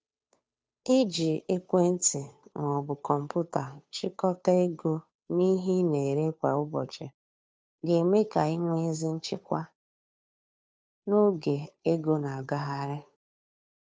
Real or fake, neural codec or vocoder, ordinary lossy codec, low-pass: fake; codec, 16 kHz, 2 kbps, FunCodec, trained on Chinese and English, 25 frames a second; none; none